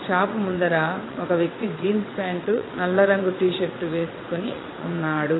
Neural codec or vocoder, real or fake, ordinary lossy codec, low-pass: vocoder, 22.05 kHz, 80 mel bands, WaveNeXt; fake; AAC, 16 kbps; 7.2 kHz